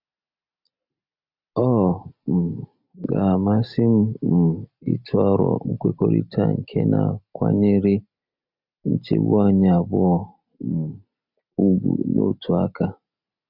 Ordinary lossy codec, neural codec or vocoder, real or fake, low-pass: none; none; real; 5.4 kHz